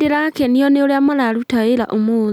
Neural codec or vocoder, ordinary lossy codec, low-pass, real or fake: none; none; 19.8 kHz; real